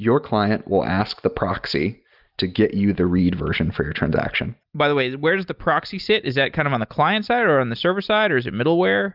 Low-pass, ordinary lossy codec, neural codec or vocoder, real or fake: 5.4 kHz; Opus, 24 kbps; none; real